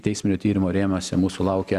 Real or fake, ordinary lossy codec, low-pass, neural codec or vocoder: real; Opus, 64 kbps; 14.4 kHz; none